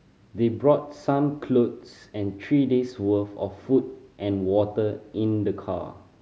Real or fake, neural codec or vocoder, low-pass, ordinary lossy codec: real; none; none; none